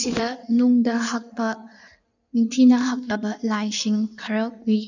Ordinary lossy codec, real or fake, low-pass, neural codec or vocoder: none; fake; 7.2 kHz; codec, 16 kHz in and 24 kHz out, 1.1 kbps, FireRedTTS-2 codec